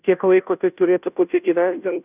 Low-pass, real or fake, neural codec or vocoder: 3.6 kHz; fake; codec, 16 kHz, 0.5 kbps, FunCodec, trained on Chinese and English, 25 frames a second